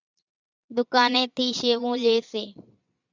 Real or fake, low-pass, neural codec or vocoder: fake; 7.2 kHz; vocoder, 22.05 kHz, 80 mel bands, Vocos